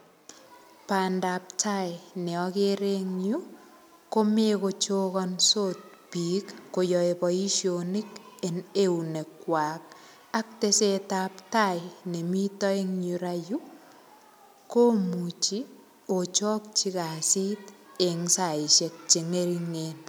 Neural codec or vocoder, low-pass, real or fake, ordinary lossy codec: none; none; real; none